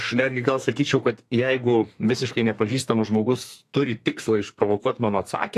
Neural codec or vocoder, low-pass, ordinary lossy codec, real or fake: codec, 32 kHz, 1.9 kbps, SNAC; 14.4 kHz; AAC, 64 kbps; fake